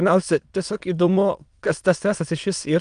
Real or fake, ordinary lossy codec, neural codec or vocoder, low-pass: fake; Opus, 32 kbps; autoencoder, 22.05 kHz, a latent of 192 numbers a frame, VITS, trained on many speakers; 9.9 kHz